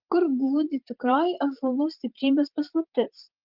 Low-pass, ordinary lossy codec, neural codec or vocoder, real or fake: 5.4 kHz; Opus, 32 kbps; vocoder, 44.1 kHz, 128 mel bands, Pupu-Vocoder; fake